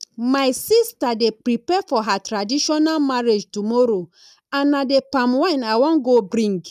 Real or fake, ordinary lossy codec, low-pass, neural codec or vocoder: real; none; 14.4 kHz; none